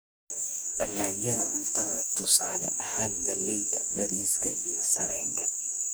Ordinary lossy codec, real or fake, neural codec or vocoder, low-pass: none; fake; codec, 44.1 kHz, 2.6 kbps, DAC; none